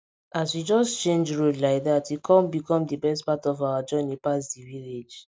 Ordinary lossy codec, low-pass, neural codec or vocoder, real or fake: none; none; none; real